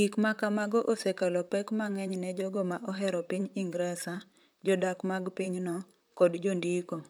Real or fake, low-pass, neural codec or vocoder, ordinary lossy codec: fake; none; vocoder, 44.1 kHz, 128 mel bands, Pupu-Vocoder; none